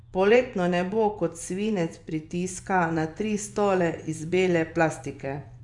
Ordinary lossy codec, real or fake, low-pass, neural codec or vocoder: none; fake; 10.8 kHz; vocoder, 24 kHz, 100 mel bands, Vocos